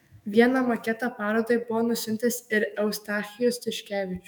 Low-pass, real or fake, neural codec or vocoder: 19.8 kHz; fake; autoencoder, 48 kHz, 128 numbers a frame, DAC-VAE, trained on Japanese speech